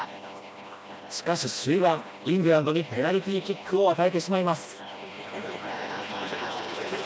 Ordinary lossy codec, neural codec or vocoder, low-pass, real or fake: none; codec, 16 kHz, 1 kbps, FreqCodec, smaller model; none; fake